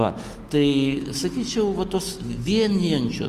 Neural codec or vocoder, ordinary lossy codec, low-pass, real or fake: autoencoder, 48 kHz, 128 numbers a frame, DAC-VAE, trained on Japanese speech; Opus, 24 kbps; 14.4 kHz; fake